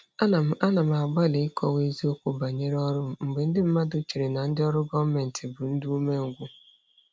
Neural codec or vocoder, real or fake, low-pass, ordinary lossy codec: none; real; none; none